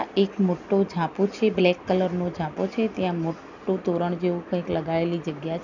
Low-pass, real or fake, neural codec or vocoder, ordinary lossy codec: 7.2 kHz; real; none; none